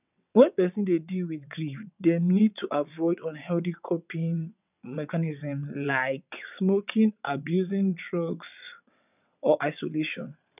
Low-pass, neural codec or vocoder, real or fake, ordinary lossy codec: 3.6 kHz; vocoder, 22.05 kHz, 80 mel bands, WaveNeXt; fake; none